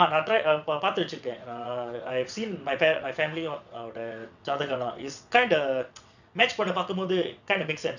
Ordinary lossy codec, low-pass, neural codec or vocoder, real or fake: none; 7.2 kHz; vocoder, 22.05 kHz, 80 mel bands, WaveNeXt; fake